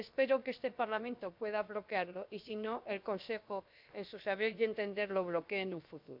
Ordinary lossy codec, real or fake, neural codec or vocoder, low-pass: MP3, 48 kbps; fake; codec, 16 kHz, about 1 kbps, DyCAST, with the encoder's durations; 5.4 kHz